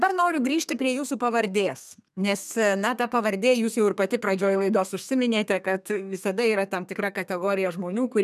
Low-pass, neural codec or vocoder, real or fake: 14.4 kHz; codec, 32 kHz, 1.9 kbps, SNAC; fake